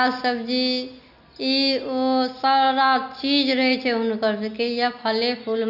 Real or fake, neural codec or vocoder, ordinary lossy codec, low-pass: real; none; none; 5.4 kHz